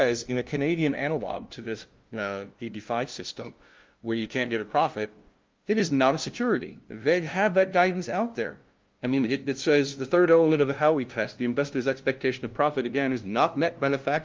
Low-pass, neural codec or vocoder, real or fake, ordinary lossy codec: 7.2 kHz; codec, 16 kHz, 0.5 kbps, FunCodec, trained on LibriTTS, 25 frames a second; fake; Opus, 32 kbps